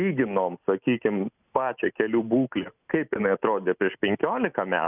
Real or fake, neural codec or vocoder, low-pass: real; none; 3.6 kHz